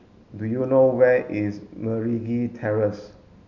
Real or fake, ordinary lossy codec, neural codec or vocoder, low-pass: real; none; none; 7.2 kHz